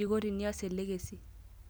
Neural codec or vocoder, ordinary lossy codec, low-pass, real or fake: none; none; none; real